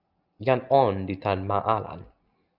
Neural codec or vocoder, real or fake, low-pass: none; real; 5.4 kHz